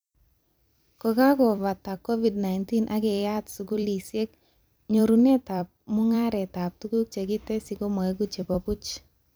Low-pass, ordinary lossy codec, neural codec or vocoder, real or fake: none; none; vocoder, 44.1 kHz, 128 mel bands every 256 samples, BigVGAN v2; fake